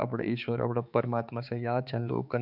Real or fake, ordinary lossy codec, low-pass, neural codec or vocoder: fake; none; 5.4 kHz; codec, 16 kHz, 4 kbps, X-Codec, HuBERT features, trained on balanced general audio